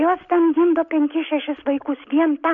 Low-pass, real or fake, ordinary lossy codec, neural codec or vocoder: 7.2 kHz; real; AAC, 64 kbps; none